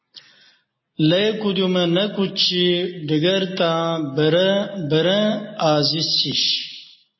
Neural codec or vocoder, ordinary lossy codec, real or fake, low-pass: none; MP3, 24 kbps; real; 7.2 kHz